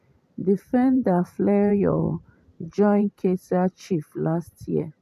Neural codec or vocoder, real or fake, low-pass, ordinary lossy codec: vocoder, 44.1 kHz, 128 mel bands every 512 samples, BigVGAN v2; fake; 14.4 kHz; none